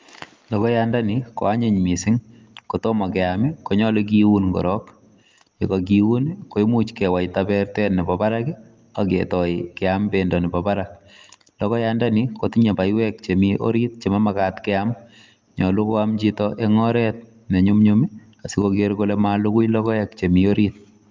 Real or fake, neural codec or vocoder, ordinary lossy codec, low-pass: real; none; Opus, 24 kbps; 7.2 kHz